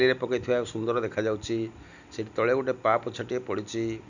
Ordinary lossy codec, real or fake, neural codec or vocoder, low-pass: none; real; none; 7.2 kHz